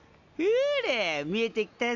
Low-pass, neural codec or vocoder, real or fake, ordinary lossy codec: 7.2 kHz; none; real; none